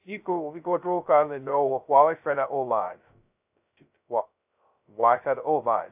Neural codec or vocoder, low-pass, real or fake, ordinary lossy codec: codec, 16 kHz, 0.2 kbps, FocalCodec; 3.6 kHz; fake; AAC, 32 kbps